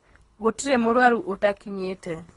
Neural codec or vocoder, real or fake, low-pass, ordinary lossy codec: codec, 24 kHz, 3 kbps, HILCodec; fake; 10.8 kHz; AAC, 32 kbps